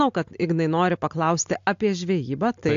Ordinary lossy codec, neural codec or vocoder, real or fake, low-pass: AAC, 64 kbps; none; real; 7.2 kHz